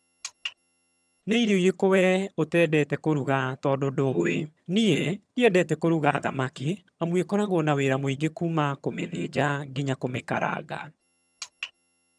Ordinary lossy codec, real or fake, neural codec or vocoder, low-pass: none; fake; vocoder, 22.05 kHz, 80 mel bands, HiFi-GAN; none